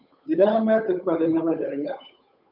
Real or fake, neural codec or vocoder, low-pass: fake; codec, 16 kHz, 8 kbps, FunCodec, trained on Chinese and English, 25 frames a second; 5.4 kHz